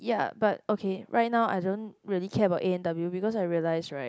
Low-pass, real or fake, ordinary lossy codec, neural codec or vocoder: none; real; none; none